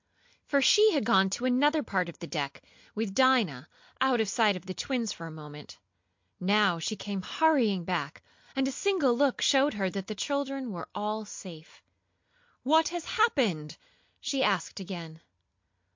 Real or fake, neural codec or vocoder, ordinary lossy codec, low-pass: real; none; MP3, 48 kbps; 7.2 kHz